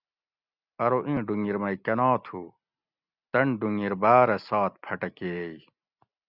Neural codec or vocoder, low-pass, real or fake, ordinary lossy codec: none; 5.4 kHz; real; Opus, 64 kbps